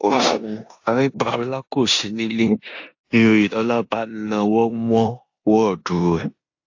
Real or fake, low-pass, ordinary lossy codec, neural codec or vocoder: fake; 7.2 kHz; AAC, 48 kbps; codec, 16 kHz in and 24 kHz out, 0.9 kbps, LongCat-Audio-Codec, four codebook decoder